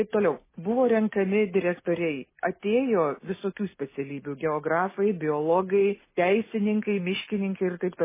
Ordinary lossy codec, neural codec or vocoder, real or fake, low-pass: MP3, 16 kbps; none; real; 3.6 kHz